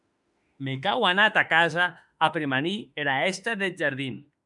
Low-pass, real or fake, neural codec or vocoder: 10.8 kHz; fake; autoencoder, 48 kHz, 32 numbers a frame, DAC-VAE, trained on Japanese speech